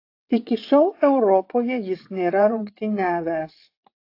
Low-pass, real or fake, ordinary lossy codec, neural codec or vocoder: 5.4 kHz; fake; AAC, 32 kbps; vocoder, 22.05 kHz, 80 mel bands, WaveNeXt